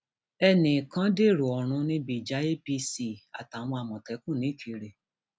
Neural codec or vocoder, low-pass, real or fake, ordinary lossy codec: none; none; real; none